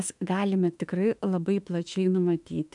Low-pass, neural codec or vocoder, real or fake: 10.8 kHz; autoencoder, 48 kHz, 32 numbers a frame, DAC-VAE, trained on Japanese speech; fake